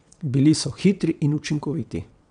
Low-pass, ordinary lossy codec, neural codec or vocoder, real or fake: 9.9 kHz; none; vocoder, 22.05 kHz, 80 mel bands, WaveNeXt; fake